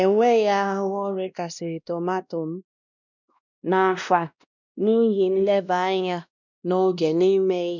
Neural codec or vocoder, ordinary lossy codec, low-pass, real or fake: codec, 16 kHz, 1 kbps, X-Codec, WavLM features, trained on Multilingual LibriSpeech; none; 7.2 kHz; fake